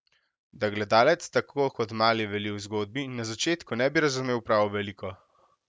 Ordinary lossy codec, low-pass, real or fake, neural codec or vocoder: none; none; real; none